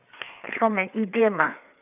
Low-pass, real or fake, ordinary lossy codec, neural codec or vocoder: 3.6 kHz; fake; none; codec, 16 kHz, 4 kbps, FreqCodec, larger model